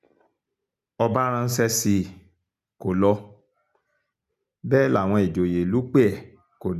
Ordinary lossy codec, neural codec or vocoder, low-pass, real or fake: none; none; 14.4 kHz; real